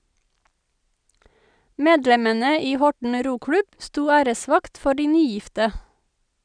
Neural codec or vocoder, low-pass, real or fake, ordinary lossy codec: none; 9.9 kHz; real; none